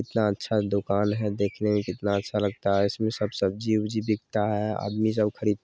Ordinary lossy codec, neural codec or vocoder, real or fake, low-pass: none; none; real; none